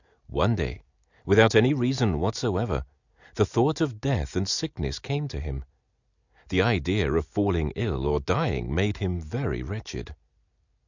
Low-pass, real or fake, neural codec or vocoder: 7.2 kHz; real; none